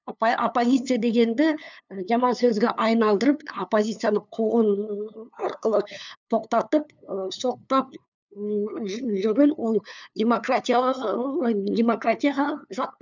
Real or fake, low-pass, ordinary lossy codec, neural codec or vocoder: fake; 7.2 kHz; none; codec, 16 kHz, 2 kbps, FunCodec, trained on LibriTTS, 25 frames a second